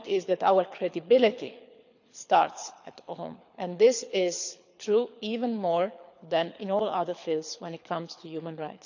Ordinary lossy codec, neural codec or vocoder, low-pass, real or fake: none; codec, 24 kHz, 6 kbps, HILCodec; 7.2 kHz; fake